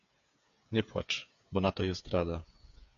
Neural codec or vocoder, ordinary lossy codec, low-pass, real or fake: codec, 16 kHz, 8 kbps, FreqCodec, smaller model; MP3, 64 kbps; 7.2 kHz; fake